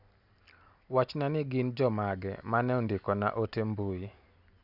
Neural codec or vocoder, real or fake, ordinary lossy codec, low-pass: none; real; none; 5.4 kHz